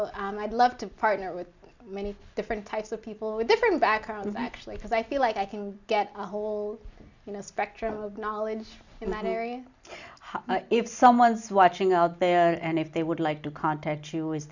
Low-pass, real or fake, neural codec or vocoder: 7.2 kHz; real; none